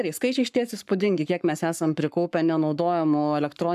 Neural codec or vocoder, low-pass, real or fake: codec, 44.1 kHz, 7.8 kbps, Pupu-Codec; 14.4 kHz; fake